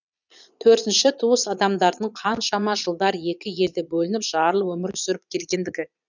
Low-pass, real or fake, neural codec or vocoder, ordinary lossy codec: 7.2 kHz; fake; vocoder, 22.05 kHz, 80 mel bands, Vocos; none